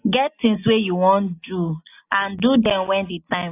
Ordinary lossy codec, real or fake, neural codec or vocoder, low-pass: AAC, 24 kbps; real; none; 3.6 kHz